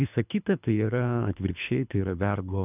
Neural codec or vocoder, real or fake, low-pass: codec, 24 kHz, 3 kbps, HILCodec; fake; 3.6 kHz